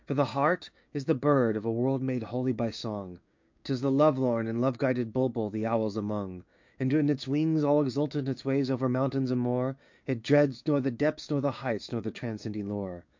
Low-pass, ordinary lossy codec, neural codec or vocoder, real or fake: 7.2 kHz; MP3, 48 kbps; codec, 16 kHz, 6 kbps, DAC; fake